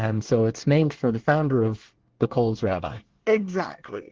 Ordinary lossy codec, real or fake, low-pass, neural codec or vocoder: Opus, 16 kbps; fake; 7.2 kHz; codec, 24 kHz, 1 kbps, SNAC